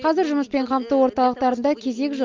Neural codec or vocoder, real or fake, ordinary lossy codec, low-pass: none; real; Opus, 32 kbps; 7.2 kHz